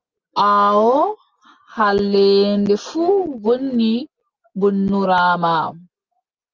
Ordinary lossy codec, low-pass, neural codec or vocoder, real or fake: Opus, 32 kbps; 7.2 kHz; none; real